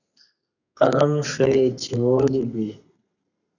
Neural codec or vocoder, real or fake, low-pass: codec, 32 kHz, 1.9 kbps, SNAC; fake; 7.2 kHz